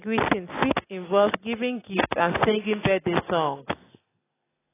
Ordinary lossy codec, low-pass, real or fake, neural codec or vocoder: AAC, 16 kbps; 3.6 kHz; real; none